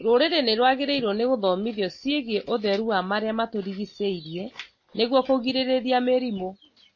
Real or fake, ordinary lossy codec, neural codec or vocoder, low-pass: real; MP3, 32 kbps; none; 7.2 kHz